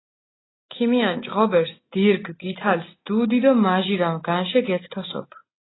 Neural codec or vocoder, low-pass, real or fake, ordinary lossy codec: none; 7.2 kHz; real; AAC, 16 kbps